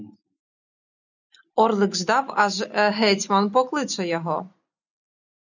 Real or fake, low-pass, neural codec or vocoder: real; 7.2 kHz; none